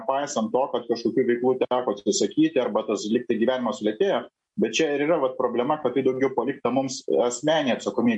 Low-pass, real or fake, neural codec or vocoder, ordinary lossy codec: 10.8 kHz; real; none; MP3, 48 kbps